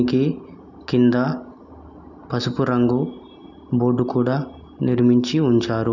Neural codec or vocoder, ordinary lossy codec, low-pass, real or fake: none; none; 7.2 kHz; real